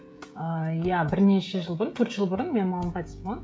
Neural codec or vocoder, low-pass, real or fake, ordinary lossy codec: codec, 16 kHz, 16 kbps, FreqCodec, smaller model; none; fake; none